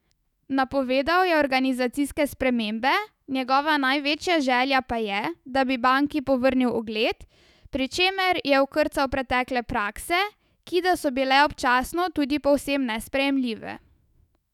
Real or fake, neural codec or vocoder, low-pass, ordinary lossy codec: fake; autoencoder, 48 kHz, 128 numbers a frame, DAC-VAE, trained on Japanese speech; 19.8 kHz; none